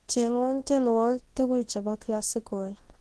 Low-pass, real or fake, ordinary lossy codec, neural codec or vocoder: 10.8 kHz; fake; Opus, 16 kbps; codec, 24 kHz, 0.9 kbps, WavTokenizer, large speech release